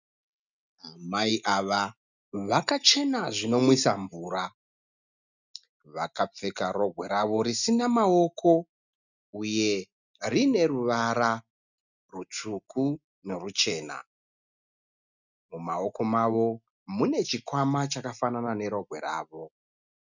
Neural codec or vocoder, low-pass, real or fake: none; 7.2 kHz; real